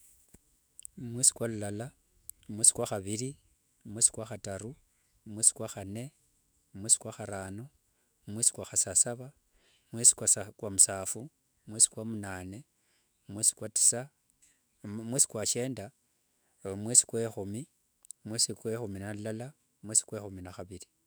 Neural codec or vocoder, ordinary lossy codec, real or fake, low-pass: autoencoder, 48 kHz, 128 numbers a frame, DAC-VAE, trained on Japanese speech; none; fake; none